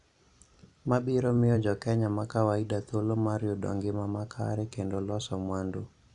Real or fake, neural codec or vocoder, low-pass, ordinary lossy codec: fake; vocoder, 44.1 kHz, 128 mel bands every 256 samples, BigVGAN v2; 10.8 kHz; none